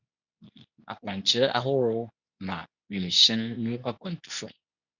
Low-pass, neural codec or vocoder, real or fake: 7.2 kHz; codec, 24 kHz, 0.9 kbps, WavTokenizer, medium speech release version 2; fake